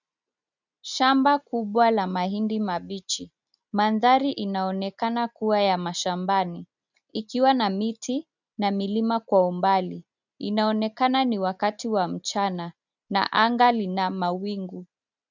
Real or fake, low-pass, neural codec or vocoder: real; 7.2 kHz; none